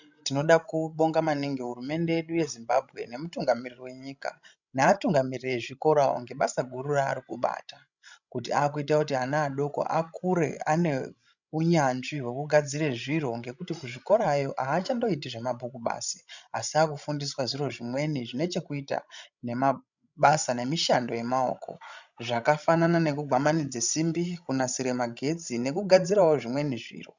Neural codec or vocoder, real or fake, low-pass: codec, 16 kHz, 16 kbps, FreqCodec, larger model; fake; 7.2 kHz